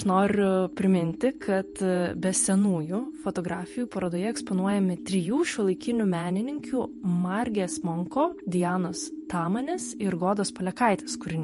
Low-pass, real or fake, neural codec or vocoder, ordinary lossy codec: 14.4 kHz; fake; vocoder, 48 kHz, 128 mel bands, Vocos; MP3, 48 kbps